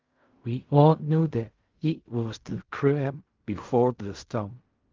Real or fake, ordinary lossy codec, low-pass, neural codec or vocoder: fake; Opus, 32 kbps; 7.2 kHz; codec, 16 kHz in and 24 kHz out, 0.4 kbps, LongCat-Audio-Codec, fine tuned four codebook decoder